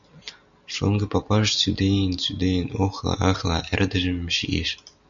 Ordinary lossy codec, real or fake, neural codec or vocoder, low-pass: MP3, 96 kbps; real; none; 7.2 kHz